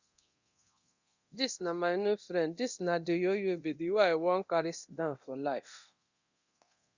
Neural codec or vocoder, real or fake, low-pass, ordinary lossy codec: codec, 24 kHz, 0.9 kbps, DualCodec; fake; 7.2 kHz; Opus, 64 kbps